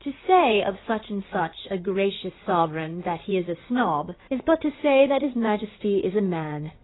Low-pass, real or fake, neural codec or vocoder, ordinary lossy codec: 7.2 kHz; fake; codec, 16 kHz in and 24 kHz out, 2.2 kbps, FireRedTTS-2 codec; AAC, 16 kbps